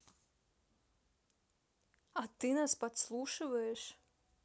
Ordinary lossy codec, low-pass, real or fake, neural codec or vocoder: none; none; real; none